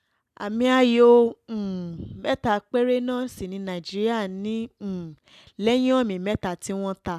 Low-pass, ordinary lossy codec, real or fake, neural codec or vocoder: 14.4 kHz; none; real; none